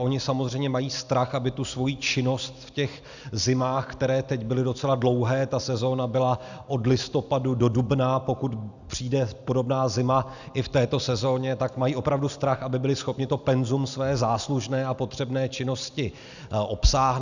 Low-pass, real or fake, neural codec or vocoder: 7.2 kHz; real; none